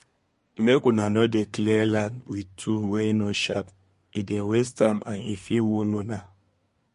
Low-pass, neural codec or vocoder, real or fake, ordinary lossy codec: 10.8 kHz; codec, 24 kHz, 1 kbps, SNAC; fake; MP3, 48 kbps